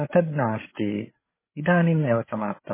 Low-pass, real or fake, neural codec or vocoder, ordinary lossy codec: 3.6 kHz; fake; codec, 16 kHz, 8 kbps, FreqCodec, larger model; MP3, 16 kbps